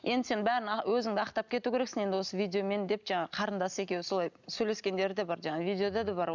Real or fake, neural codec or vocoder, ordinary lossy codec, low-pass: real; none; none; 7.2 kHz